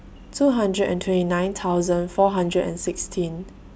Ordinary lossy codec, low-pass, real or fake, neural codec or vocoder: none; none; real; none